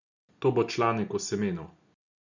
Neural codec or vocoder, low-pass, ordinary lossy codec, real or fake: none; 7.2 kHz; none; real